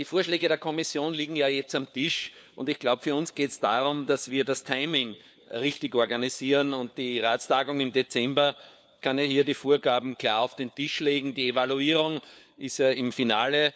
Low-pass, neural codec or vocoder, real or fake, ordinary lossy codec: none; codec, 16 kHz, 4 kbps, FunCodec, trained on LibriTTS, 50 frames a second; fake; none